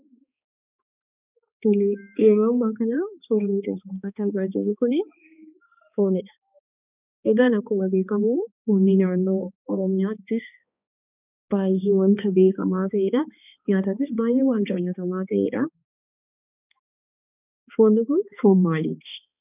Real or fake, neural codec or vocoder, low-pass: fake; codec, 16 kHz, 2 kbps, X-Codec, HuBERT features, trained on balanced general audio; 3.6 kHz